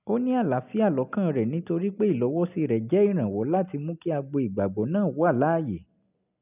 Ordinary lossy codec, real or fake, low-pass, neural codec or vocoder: none; real; 3.6 kHz; none